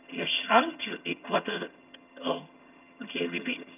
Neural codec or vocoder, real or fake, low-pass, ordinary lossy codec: vocoder, 22.05 kHz, 80 mel bands, HiFi-GAN; fake; 3.6 kHz; none